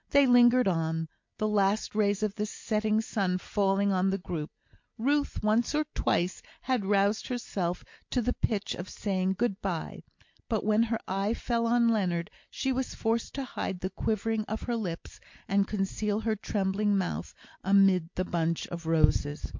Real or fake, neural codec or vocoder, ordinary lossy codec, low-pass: real; none; MP3, 48 kbps; 7.2 kHz